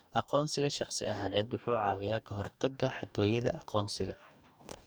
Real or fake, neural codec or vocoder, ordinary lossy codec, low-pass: fake; codec, 44.1 kHz, 2.6 kbps, DAC; none; none